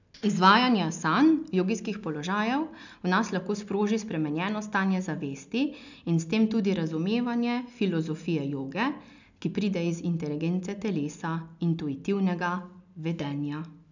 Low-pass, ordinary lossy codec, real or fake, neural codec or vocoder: 7.2 kHz; none; real; none